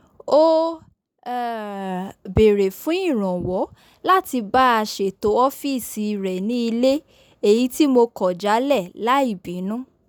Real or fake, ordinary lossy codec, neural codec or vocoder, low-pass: real; none; none; none